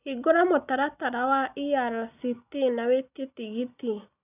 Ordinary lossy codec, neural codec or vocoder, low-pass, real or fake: none; none; 3.6 kHz; real